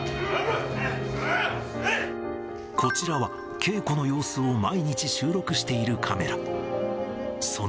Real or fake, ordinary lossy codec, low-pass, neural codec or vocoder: real; none; none; none